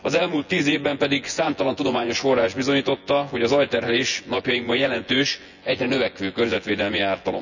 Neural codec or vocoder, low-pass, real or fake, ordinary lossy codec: vocoder, 24 kHz, 100 mel bands, Vocos; 7.2 kHz; fake; none